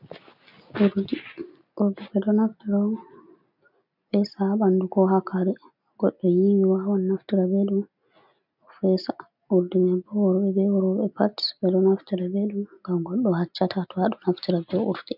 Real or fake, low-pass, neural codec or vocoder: real; 5.4 kHz; none